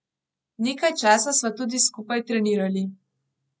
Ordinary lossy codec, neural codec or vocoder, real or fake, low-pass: none; none; real; none